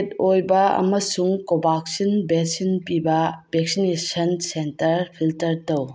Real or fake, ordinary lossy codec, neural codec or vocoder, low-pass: real; none; none; none